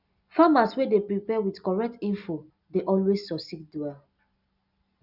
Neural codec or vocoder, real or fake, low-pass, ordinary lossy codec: none; real; 5.4 kHz; none